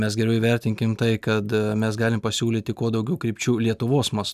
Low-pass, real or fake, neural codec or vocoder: 14.4 kHz; real; none